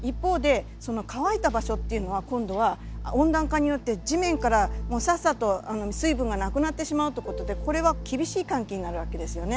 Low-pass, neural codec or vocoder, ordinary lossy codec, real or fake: none; none; none; real